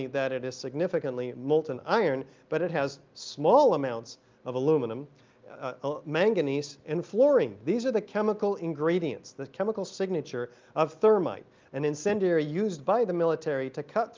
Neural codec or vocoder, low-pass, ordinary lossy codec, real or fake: none; 7.2 kHz; Opus, 24 kbps; real